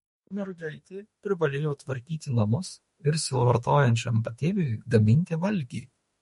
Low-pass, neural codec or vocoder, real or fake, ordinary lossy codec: 19.8 kHz; autoencoder, 48 kHz, 32 numbers a frame, DAC-VAE, trained on Japanese speech; fake; MP3, 48 kbps